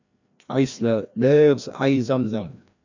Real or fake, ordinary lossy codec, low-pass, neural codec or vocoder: fake; none; 7.2 kHz; codec, 16 kHz, 1 kbps, FreqCodec, larger model